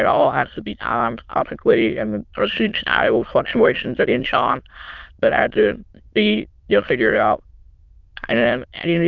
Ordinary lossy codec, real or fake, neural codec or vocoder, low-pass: Opus, 32 kbps; fake; autoencoder, 22.05 kHz, a latent of 192 numbers a frame, VITS, trained on many speakers; 7.2 kHz